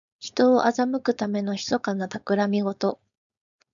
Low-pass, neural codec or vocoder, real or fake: 7.2 kHz; codec, 16 kHz, 4.8 kbps, FACodec; fake